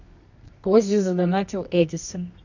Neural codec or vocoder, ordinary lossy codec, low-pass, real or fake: codec, 24 kHz, 0.9 kbps, WavTokenizer, medium music audio release; none; 7.2 kHz; fake